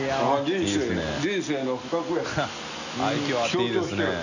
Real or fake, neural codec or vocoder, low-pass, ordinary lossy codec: real; none; 7.2 kHz; none